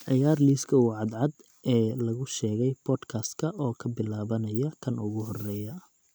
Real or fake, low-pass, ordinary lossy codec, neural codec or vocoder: real; none; none; none